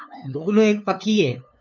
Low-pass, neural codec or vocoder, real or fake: 7.2 kHz; codec, 16 kHz, 2 kbps, FunCodec, trained on LibriTTS, 25 frames a second; fake